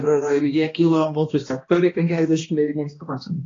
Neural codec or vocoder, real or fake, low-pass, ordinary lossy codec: codec, 16 kHz, 1 kbps, X-Codec, HuBERT features, trained on balanced general audio; fake; 7.2 kHz; AAC, 32 kbps